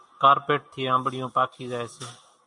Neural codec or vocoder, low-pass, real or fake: none; 10.8 kHz; real